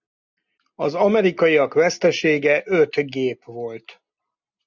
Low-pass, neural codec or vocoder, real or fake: 7.2 kHz; none; real